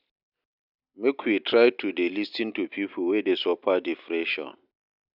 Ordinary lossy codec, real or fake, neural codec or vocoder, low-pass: none; real; none; 5.4 kHz